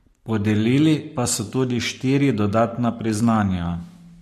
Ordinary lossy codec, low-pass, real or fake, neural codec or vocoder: MP3, 64 kbps; 14.4 kHz; fake; codec, 44.1 kHz, 7.8 kbps, Pupu-Codec